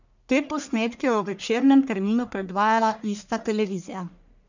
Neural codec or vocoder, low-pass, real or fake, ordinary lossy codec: codec, 44.1 kHz, 1.7 kbps, Pupu-Codec; 7.2 kHz; fake; none